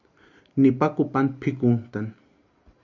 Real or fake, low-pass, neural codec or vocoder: real; 7.2 kHz; none